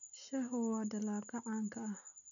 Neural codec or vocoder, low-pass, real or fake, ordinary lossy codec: none; 7.2 kHz; real; none